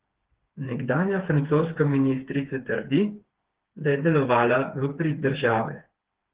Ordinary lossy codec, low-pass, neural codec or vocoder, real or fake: Opus, 16 kbps; 3.6 kHz; codec, 16 kHz, 4 kbps, FreqCodec, smaller model; fake